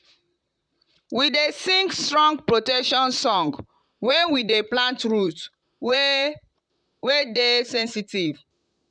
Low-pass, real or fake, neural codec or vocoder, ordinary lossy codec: 9.9 kHz; fake; vocoder, 44.1 kHz, 128 mel bands, Pupu-Vocoder; none